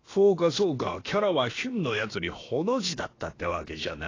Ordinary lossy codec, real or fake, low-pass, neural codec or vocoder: AAC, 32 kbps; fake; 7.2 kHz; codec, 16 kHz, about 1 kbps, DyCAST, with the encoder's durations